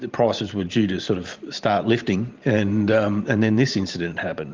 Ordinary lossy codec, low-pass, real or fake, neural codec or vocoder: Opus, 32 kbps; 7.2 kHz; fake; vocoder, 44.1 kHz, 128 mel bands every 512 samples, BigVGAN v2